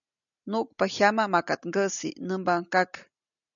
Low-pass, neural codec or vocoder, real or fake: 7.2 kHz; none; real